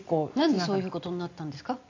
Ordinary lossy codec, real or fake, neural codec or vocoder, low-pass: AAC, 48 kbps; real; none; 7.2 kHz